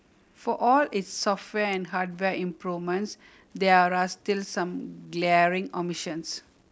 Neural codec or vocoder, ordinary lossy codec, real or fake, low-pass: none; none; real; none